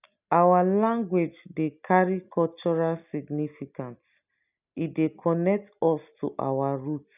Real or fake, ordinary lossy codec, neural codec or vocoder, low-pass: real; none; none; 3.6 kHz